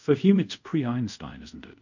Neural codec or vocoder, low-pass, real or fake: codec, 24 kHz, 0.5 kbps, DualCodec; 7.2 kHz; fake